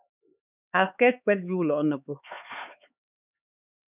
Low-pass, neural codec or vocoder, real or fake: 3.6 kHz; codec, 16 kHz, 4 kbps, X-Codec, HuBERT features, trained on LibriSpeech; fake